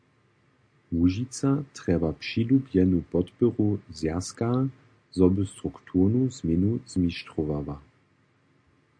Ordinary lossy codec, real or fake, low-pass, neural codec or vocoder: MP3, 64 kbps; real; 9.9 kHz; none